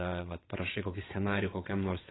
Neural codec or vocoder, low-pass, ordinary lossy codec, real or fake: vocoder, 22.05 kHz, 80 mel bands, WaveNeXt; 7.2 kHz; AAC, 16 kbps; fake